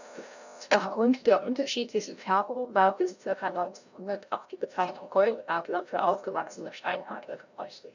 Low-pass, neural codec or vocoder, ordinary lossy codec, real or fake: 7.2 kHz; codec, 16 kHz, 0.5 kbps, FreqCodec, larger model; none; fake